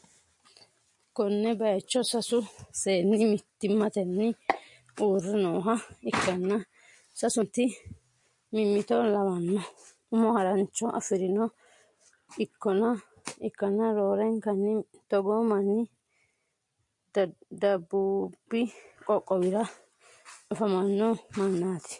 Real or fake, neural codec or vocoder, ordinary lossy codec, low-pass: real; none; MP3, 48 kbps; 10.8 kHz